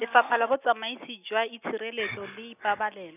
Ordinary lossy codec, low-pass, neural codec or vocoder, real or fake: none; 3.6 kHz; none; real